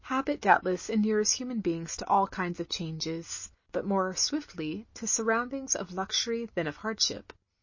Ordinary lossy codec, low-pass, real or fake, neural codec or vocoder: MP3, 32 kbps; 7.2 kHz; real; none